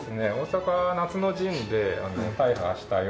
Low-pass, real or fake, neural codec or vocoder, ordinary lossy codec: none; real; none; none